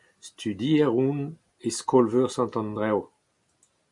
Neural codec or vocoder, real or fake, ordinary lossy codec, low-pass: none; real; MP3, 96 kbps; 10.8 kHz